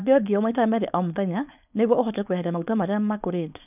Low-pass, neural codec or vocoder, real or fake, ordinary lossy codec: 3.6 kHz; codec, 16 kHz, 4.8 kbps, FACodec; fake; none